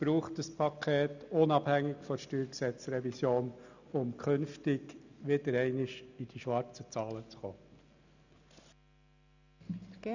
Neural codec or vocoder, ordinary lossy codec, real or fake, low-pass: none; none; real; 7.2 kHz